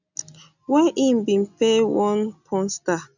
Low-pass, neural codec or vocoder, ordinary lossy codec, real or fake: 7.2 kHz; none; none; real